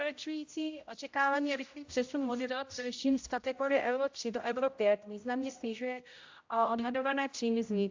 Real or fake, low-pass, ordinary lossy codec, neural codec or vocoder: fake; 7.2 kHz; AAC, 48 kbps; codec, 16 kHz, 0.5 kbps, X-Codec, HuBERT features, trained on general audio